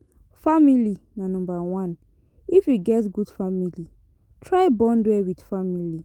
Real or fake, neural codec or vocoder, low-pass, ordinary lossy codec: fake; autoencoder, 48 kHz, 128 numbers a frame, DAC-VAE, trained on Japanese speech; 19.8 kHz; Opus, 24 kbps